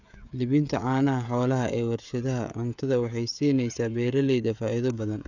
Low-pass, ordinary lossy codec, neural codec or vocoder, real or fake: 7.2 kHz; none; codec, 16 kHz, 16 kbps, FreqCodec, smaller model; fake